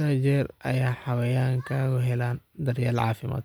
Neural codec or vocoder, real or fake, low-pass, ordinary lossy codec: none; real; none; none